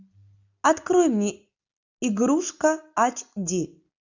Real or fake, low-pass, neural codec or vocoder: real; 7.2 kHz; none